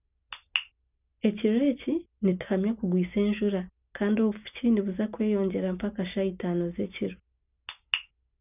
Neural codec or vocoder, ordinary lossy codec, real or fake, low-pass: none; none; real; 3.6 kHz